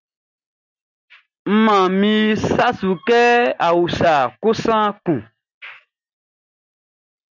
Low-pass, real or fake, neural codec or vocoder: 7.2 kHz; real; none